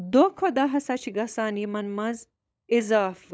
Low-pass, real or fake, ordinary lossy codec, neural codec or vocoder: none; fake; none; codec, 16 kHz, 16 kbps, FunCodec, trained on LibriTTS, 50 frames a second